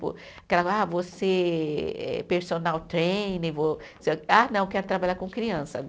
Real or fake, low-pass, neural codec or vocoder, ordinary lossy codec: real; none; none; none